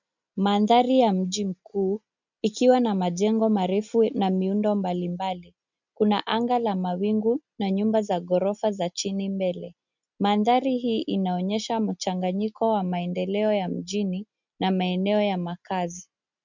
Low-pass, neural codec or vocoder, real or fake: 7.2 kHz; none; real